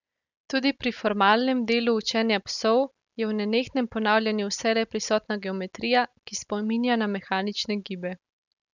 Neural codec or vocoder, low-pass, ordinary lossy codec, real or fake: none; 7.2 kHz; none; real